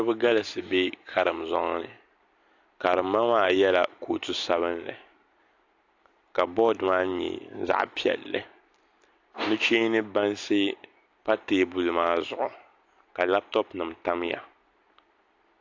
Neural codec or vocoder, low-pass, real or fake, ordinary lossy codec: none; 7.2 kHz; real; AAC, 48 kbps